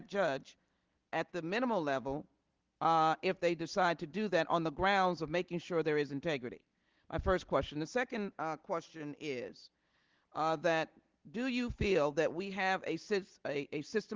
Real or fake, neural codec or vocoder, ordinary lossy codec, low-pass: real; none; Opus, 16 kbps; 7.2 kHz